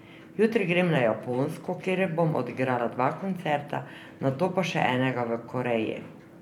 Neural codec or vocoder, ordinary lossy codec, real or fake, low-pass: vocoder, 44.1 kHz, 128 mel bands every 512 samples, BigVGAN v2; none; fake; 19.8 kHz